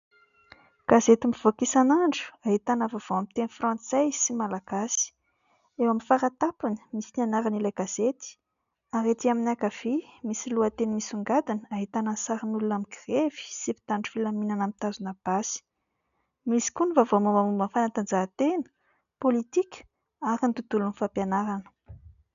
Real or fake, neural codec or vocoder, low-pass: real; none; 7.2 kHz